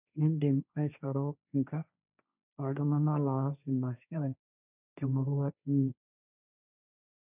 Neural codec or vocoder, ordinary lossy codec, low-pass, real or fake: codec, 16 kHz, 1.1 kbps, Voila-Tokenizer; none; 3.6 kHz; fake